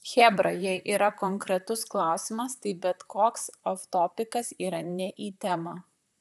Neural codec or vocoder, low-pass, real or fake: vocoder, 44.1 kHz, 128 mel bands, Pupu-Vocoder; 14.4 kHz; fake